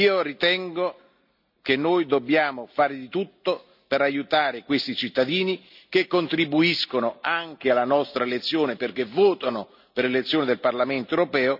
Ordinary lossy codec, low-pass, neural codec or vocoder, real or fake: none; 5.4 kHz; none; real